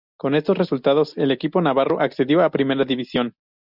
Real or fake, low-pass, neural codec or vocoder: real; 5.4 kHz; none